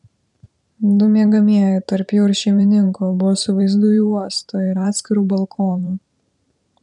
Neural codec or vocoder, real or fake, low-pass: vocoder, 44.1 kHz, 128 mel bands every 512 samples, BigVGAN v2; fake; 10.8 kHz